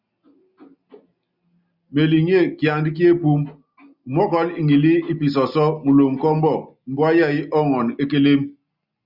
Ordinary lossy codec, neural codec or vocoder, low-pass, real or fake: Opus, 64 kbps; none; 5.4 kHz; real